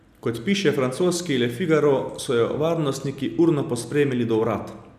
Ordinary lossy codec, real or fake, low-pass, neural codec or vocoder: none; real; 14.4 kHz; none